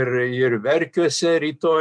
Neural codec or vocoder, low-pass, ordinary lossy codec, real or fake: none; 9.9 kHz; MP3, 96 kbps; real